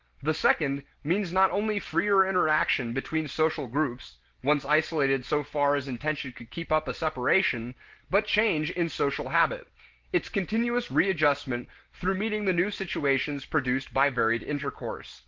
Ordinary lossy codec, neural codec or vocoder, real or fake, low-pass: Opus, 16 kbps; none; real; 7.2 kHz